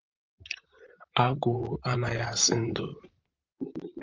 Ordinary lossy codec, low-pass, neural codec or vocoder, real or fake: Opus, 32 kbps; 7.2 kHz; vocoder, 44.1 kHz, 128 mel bands, Pupu-Vocoder; fake